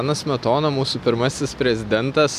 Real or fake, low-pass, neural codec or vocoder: real; 14.4 kHz; none